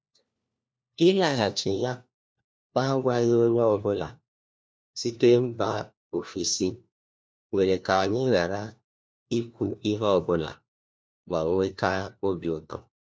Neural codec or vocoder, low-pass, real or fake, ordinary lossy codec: codec, 16 kHz, 1 kbps, FunCodec, trained on LibriTTS, 50 frames a second; none; fake; none